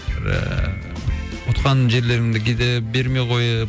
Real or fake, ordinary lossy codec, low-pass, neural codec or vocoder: real; none; none; none